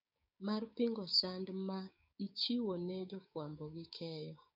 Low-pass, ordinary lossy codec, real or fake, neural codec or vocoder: 5.4 kHz; none; fake; codec, 16 kHz, 6 kbps, DAC